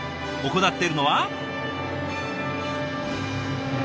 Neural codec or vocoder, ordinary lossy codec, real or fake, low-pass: none; none; real; none